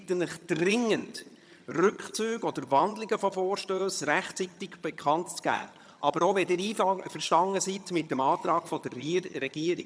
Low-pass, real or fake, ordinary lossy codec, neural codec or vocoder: none; fake; none; vocoder, 22.05 kHz, 80 mel bands, HiFi-GAN